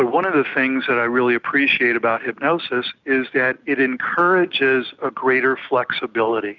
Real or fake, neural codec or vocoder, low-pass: real; none; 7.2 kHz